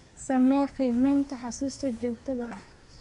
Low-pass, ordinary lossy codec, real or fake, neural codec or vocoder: 10.8 kHz; none; fake; codec, 24 kHz, 1 kbps, SNAC